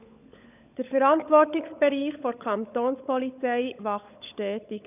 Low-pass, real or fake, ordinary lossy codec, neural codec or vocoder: 3.6 kHz; fake; none; codec, 16 kHz, 16 kbps, FunCodec, trained on LibriTTS, 50 frames a second